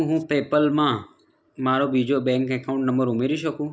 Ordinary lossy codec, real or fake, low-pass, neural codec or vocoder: none; real; none; none